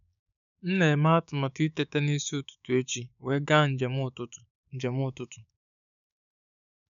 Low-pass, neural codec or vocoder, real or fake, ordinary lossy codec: 7.2 kHz; codec, 16 kHz, 6 kbps, DAC; fake; none